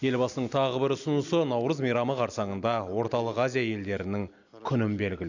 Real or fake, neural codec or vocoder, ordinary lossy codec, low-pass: real; none; none; 7.2 kHz